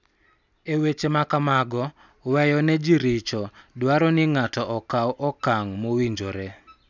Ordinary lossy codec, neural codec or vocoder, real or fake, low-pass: none; none; real; 7.2 kHz